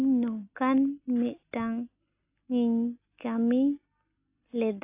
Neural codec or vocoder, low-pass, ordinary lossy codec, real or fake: none; 3.6 kHz; AAC, 32 kbps; real